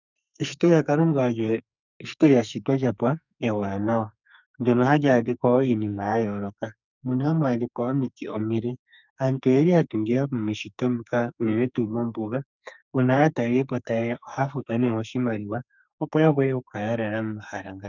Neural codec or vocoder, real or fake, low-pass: codec, 44.1 kHz, 2.6 kbps, SNAC; fake; 7.2 kHz